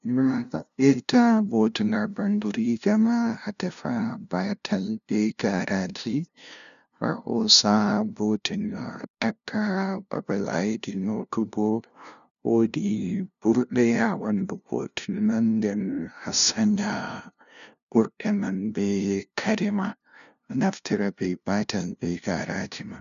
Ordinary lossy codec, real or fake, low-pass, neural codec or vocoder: none; fake; 7.2 kHz; codec, 16 kHz, 0.5 kbps, FunCodec, trained on LibriTTS, 25 frames a second